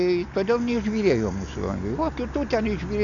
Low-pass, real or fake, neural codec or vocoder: 7.2 kHz; real; none